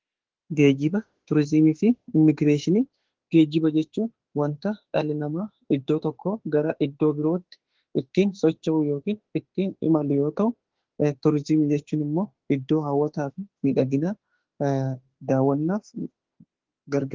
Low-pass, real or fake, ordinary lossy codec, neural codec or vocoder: 7.2 kHz; fake; Opus, 16 kbps; autoencoder, 48 kHz, 32 numbers a frame, DAC-VAE, trained on Japanese speech